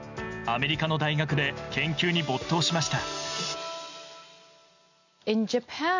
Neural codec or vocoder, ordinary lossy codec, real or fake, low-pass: none; none; real; 7.2 kHz